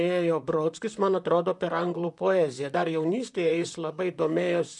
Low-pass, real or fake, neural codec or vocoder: 10.8 kHz; fake; vocoder, 44.1 kHz, 128 mel bands, Pupu-Vocoder